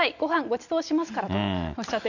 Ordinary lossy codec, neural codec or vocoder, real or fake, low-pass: none; none; real; 7.2 kHz